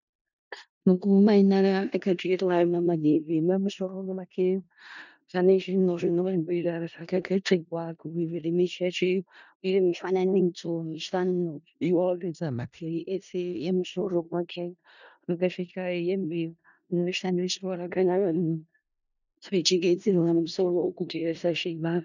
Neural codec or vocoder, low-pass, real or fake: codec, 16 kHz in and 24 kHz out, 0.4 kbps, LongCat-Audio-Codec, four codebook decoder; 7.2 kHz; fake